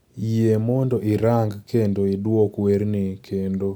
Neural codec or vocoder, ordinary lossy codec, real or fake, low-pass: none; none; real; none